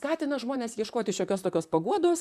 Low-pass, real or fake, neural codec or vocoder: 14.4 kHz; fake; vocoder, 44.1 kHz, 128 mel bands, Pupu-Vocoder